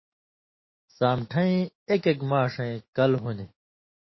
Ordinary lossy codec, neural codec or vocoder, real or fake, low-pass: MP3, 24 kbps; autoencoder, 48 kHz, 128 numbers a frame, DAC-VAE, trained on Japanese speech; fake; 7.2 kHz